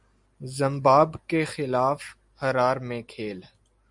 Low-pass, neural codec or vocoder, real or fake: 10.8 kHz; none; real